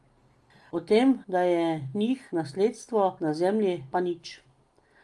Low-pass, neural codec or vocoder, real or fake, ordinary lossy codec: 10.8 kHz; none; real; Opus, 32 kbps